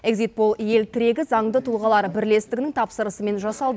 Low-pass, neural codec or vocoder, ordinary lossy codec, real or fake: none; none; none; real